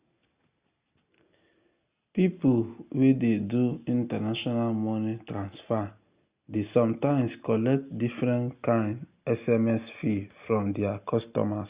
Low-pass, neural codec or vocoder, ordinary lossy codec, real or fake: 3.6 kHz; none; Opus, 64 kbps; real